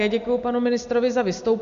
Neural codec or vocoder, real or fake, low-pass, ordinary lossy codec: none; real; 7.2 kHz; Opus, 64 kbps